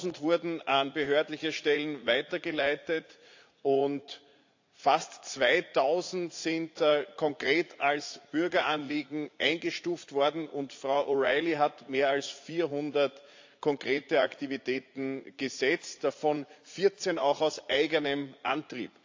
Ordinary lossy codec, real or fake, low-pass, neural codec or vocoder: AAC, 48 kbps; fake; 7.2 kHz; vocoder, 44.1 kHz, 80 mel bands, Vocos